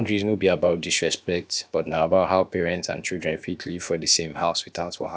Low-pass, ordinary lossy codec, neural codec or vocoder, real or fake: none; none; codec, 16 kHz, about 1 kbps, DyCAST, with the encoder's durations; fake